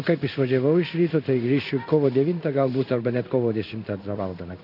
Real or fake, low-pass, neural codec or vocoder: fake; 5.4 kHz; codec, 16 kHz in and 24 kHz out, 1 kbps, XY-Tokenizer